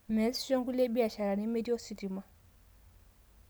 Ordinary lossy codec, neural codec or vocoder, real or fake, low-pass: none; none; real; none